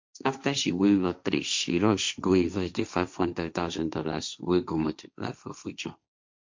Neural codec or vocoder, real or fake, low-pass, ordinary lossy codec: codec, 16 kHz, 1.1 kbps, Voila-Tokenizer; fake; 7.2 kHz; MP3, 64 kbps